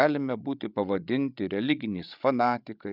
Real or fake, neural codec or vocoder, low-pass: fake; codec, 16 kHz, 16 kbps, FreqCodec, larger model; 5.4 kHz